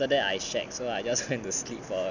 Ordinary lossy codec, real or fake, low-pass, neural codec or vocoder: none; real; 7.2 kHz; none